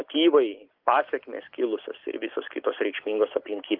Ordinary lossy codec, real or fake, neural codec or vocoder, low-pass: Opus, 32 kbps; real; none; 5.4 kHz